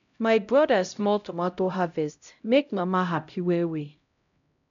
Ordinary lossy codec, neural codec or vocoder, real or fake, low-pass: none; codec, 16 kHz, 0.5 kbps, X-Codec, HuBERT features, trained on LibriSpeech; fake; 7.2 kHz